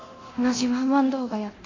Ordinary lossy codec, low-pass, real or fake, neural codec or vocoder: AAC, 32 kbps; 7.2 kHz; fake; codec, 24 kHz, 0.9 kbps, DualCodec